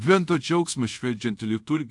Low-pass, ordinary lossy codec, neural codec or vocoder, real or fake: 10.8 kHz; AAC, 64 kbps; codec, 24 kHz, 0.5 kbps, DualCodec; fake